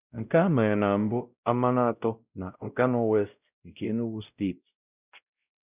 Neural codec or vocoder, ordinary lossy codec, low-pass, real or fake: codec, 16 kHz, 0.5 kbps, X-Codec, WavLM features, trained on Multilingual LibriSpeech; none; 3.6 kHz; fake